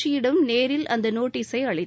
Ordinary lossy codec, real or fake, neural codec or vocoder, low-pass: none; real; none; none